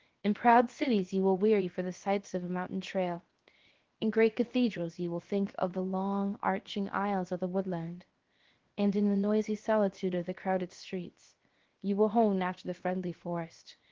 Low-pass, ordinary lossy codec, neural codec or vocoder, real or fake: 7.2 kHz; Opus, 16 kbps; codec, 16 kHz, 0.7 kbps, FocalCodec; fake